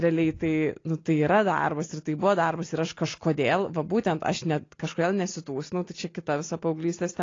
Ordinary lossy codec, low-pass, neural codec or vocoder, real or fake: AAC, 32 kbps; 7.2 kHz; none; real